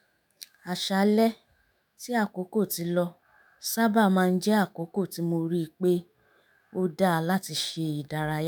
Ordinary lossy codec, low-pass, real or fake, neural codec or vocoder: none; none; fake; autoencoder, 48 kHz, 128 numbers a frame, DAC-VAE, trained on Japanese speech